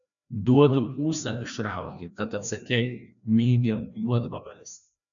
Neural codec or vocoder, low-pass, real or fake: codec, 16 kHz, 1 kbps, FreqCodec, larger model; 7.2 kHz; fake